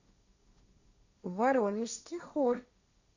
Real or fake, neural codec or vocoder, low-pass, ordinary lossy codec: fake; codec, 16 kHz, 1.1 kbps, Voila-Tokenizer; 7.2 kHz; Opus, 64 kbps